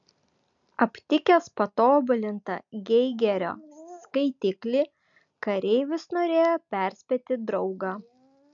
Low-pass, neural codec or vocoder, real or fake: 7.2 kHz; none; real